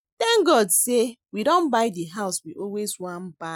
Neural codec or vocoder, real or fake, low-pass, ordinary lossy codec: none; real; none; none